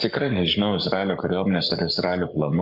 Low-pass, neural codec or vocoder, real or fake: 5.4 kHz; codec, 44.1 kHz, 7.8 kbps, Pupu-Codec; fake